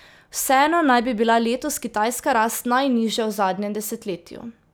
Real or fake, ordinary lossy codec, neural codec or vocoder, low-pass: real; none; none; none